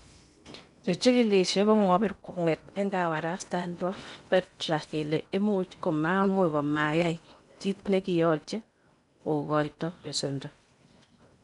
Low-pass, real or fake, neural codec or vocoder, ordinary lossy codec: 10.8 kHz; fake; codec, 16 kHz in and 24 kHz out, 0.8 kbps, FocalCodec, streaming, 65536 codes; none